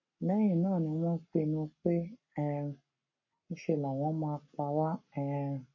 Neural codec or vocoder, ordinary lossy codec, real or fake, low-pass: codec, 44.1 kHz, 7.8 kbps, Pupu-Codec; MP3, 32 kbps; fake; 7.2 kHz